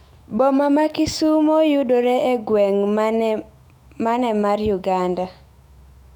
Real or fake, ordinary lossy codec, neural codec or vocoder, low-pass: fake; none; autoencoder, 48 kHz, 128 numbers a frame, DAC-VAE, trained on Japanese speech; 19.8 kHz